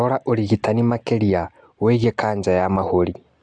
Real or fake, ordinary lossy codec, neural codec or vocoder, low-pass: real; AAC, 64 kbps; none; 9.9 kHz